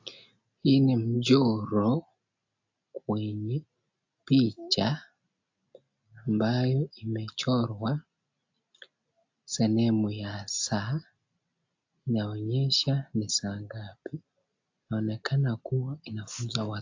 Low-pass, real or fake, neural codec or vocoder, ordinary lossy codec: 7.2 kHz; real; none; AAC, 48 kbps